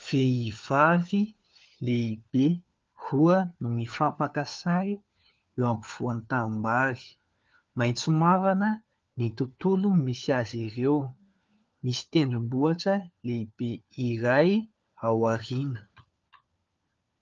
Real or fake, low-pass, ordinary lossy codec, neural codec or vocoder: fake; 7.2 kHz; Opus, 24 kbps; codec, 16 kHz, 4 kbps, FunCodec, trained on LibriTTS, 50 frames a second